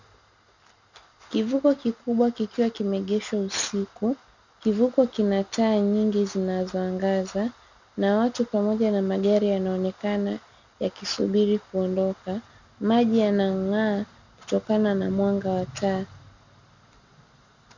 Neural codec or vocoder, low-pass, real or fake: none; 7.2 kHz; real